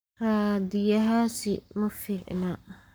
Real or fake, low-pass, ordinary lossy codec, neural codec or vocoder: fake; none; none; codec, 44.1 kHz, 7.8 kbps, Pupu-Codec